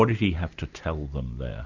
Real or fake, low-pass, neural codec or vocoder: real; 7.2 kHz; none